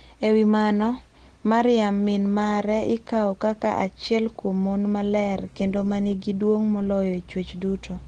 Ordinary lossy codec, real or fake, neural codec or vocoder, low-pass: Opus, 16 kbps; real; none; 9.9 kHz